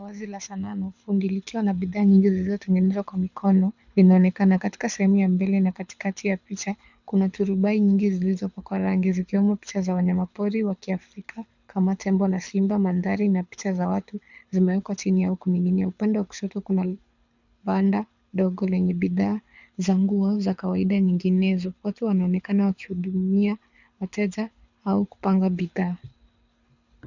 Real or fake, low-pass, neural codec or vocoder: fake; 7.2 kHz; codec, 24 kHz, 6 kbps, HILCodec